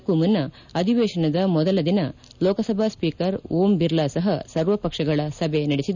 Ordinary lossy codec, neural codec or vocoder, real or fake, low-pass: none; none; real; 7.2 kHz